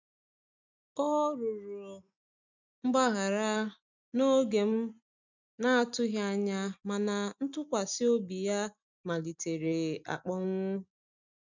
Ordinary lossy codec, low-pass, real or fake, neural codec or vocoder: none; 7.2 kHz; real; none